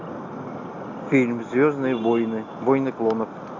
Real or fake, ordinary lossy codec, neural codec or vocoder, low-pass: real; AAC, 48 kbps; none; 7.2 kHz